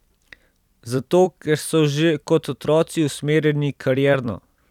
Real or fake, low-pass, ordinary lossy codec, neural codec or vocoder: fake; 19.8 kHz; none; vocoder, 44.1 kHz, 128 mel bands every 256 samples, BigVGAN v2